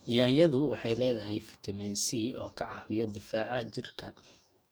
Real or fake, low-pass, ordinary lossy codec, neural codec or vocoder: fake; none; none; codec, 44.1 kHz, 2.6 kbps, DAC